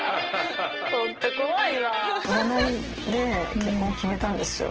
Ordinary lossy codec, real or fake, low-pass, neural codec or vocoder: Opus, 16 kbps; real; 7.2 kHz; none